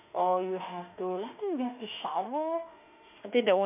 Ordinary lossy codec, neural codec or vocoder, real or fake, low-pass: none; autoencoder, 48 kHz, 32 numbers a frame, DAC-VAE, trained on Japanese speech; fake; 3.6 kHz